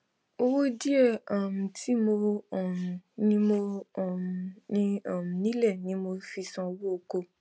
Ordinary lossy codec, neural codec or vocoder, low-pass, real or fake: none; none; none; real